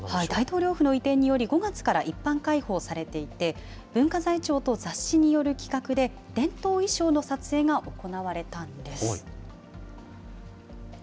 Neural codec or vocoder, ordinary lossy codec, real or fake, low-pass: none; none; real; none